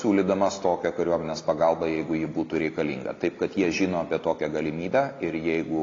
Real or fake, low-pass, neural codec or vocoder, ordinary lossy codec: real; 7.2 kHz; none; AAC, 32 kbps